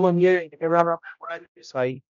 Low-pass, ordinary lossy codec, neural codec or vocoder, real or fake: 7.2 kHz; none; codec, 16 kHz, 0.5 kbps, X-Codec, HuBERT features, trained on general audio; fake